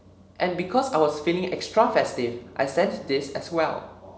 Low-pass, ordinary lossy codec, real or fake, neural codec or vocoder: none; none; real; none